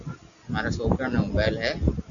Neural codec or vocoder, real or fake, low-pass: none; real; 7.2 kHz